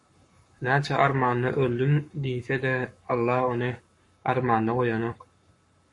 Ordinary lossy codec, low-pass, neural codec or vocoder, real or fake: MP3, 48 kbps; 10.8 kHz; codec, 44.1 kHz, 7.8 kbps, Pupu-Codec; fake